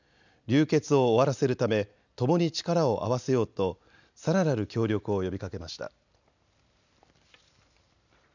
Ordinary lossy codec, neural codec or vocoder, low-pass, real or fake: none; none; 7.2 kHz; real